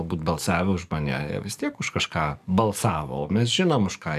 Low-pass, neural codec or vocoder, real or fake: 14.4 kHz; codec, 44.1 kHz, 7.8 kbps, DAC; fake